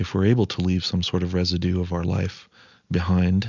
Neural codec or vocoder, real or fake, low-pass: none; real; 7.2 kHz